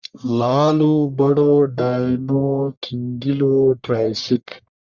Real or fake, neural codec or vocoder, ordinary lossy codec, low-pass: fake; codec, 44.1 kHz, 1.7 kbps, Pupu-Codec; Opus, 64 kbps; 7.2 kHz